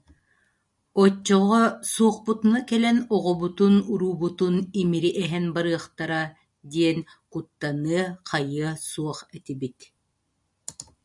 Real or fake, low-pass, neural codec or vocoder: real; 10.8 kHz; none